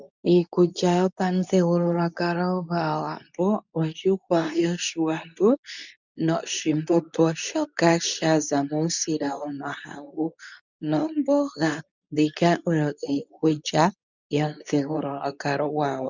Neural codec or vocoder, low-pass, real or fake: codec, 24 kHz, 0.9 kbps, WavTokenizer, medium speech release version 2; 7.2 kHz; fake